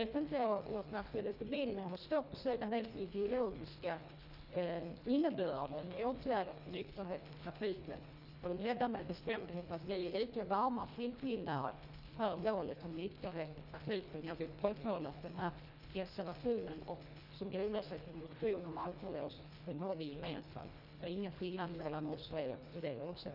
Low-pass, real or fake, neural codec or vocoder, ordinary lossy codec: 5.4 kHz; fake; codec, 24 kHz, 1.5 kbps, HILCodec; none